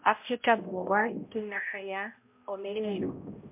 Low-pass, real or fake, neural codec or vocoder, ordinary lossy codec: 3.6 kHz; fake; codec, 16 kHz, 0.5 kbps, X-Codec, HuBERT features, trained on balanced general audio; MP3, 32 kbps